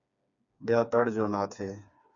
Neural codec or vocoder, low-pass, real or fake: codec, 16 kHz, 4 kbps, FreqCodec, smaller model; 7.2 kHz; fake